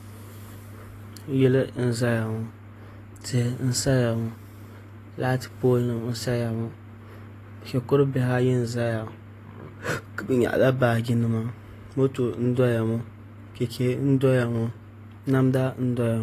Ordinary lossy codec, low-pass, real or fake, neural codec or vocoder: AAC, 48 kbps; 14.4 kHz; real; none